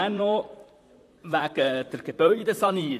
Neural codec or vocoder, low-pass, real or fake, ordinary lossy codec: vocoder, 44.1 kHz, 128 mel bands, Pupu-Vocoder; 14.4 kHz; fake; AAC, 64 kbps